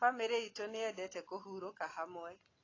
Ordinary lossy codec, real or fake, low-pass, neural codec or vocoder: AAC, 32 kbps; real; 7.2 kHz; none